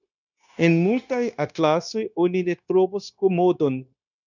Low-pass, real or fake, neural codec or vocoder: 7.2 kHz; fake; codec, 16 kHz, 0.9 kbps, LongCat-Audio-Codec